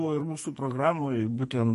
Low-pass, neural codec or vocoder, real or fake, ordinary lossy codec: 14.4 kHz; codec, 44.1 kHz, 2.6 kbps, SNAC; fake; MP3, 48 kbps